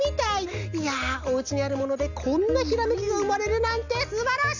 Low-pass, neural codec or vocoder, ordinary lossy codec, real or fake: 7.2 kHz; none; none; real